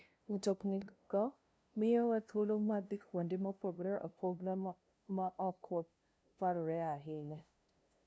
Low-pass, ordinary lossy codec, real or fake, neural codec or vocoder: none; none; fake; codec, 16 kHz, 0.5 kbps, FunCodec, trained on LibriTTS, 25 frames a second